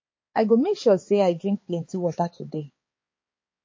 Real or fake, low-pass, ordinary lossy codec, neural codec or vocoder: fake; 7.2 kHz; MP3, 32 kbps; codec, 16 kHz, 4 kbps, X-Codec, HuBERT features, trained on balanced general audio